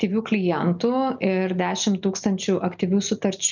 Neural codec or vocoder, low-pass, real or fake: none; 7.2 kHz; real